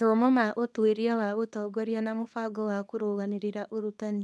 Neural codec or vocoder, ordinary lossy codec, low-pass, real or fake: codec, 24 kHz, 0.9 kbps, WavTokenizer, small release; none; none; fake